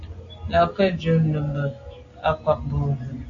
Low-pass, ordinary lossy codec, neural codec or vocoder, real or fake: 7.2 kHz; AAC, 48 kbps; none; real